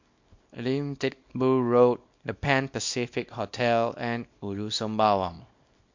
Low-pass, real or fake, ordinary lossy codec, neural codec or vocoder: 7.2 kHz; fake; MP3, 48 kbps; codec, 24 kHz, 0.9 kbps, WavTokenizer, small release